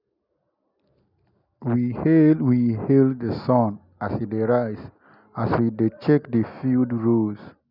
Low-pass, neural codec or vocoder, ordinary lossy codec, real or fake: 5.4 kHz; none; none; real